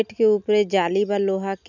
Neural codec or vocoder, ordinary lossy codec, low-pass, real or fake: none; none; 7.2 kHz; real